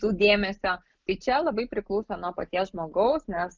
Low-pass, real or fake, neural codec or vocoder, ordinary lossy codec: 7.2 kHz; real; none; Opus, 24 kbps